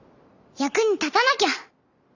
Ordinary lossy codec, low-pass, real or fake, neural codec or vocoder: none; 7.2 kHz; real; none